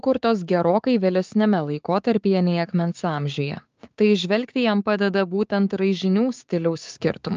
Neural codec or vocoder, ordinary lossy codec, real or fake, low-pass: codec, 16 kHz, 6 kbps, DAC; Opus, 24 kbps; fake; 7.2 kHz